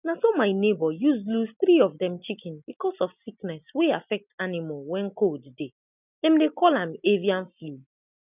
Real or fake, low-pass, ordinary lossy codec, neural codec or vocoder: real; 3.6 kHz; none; none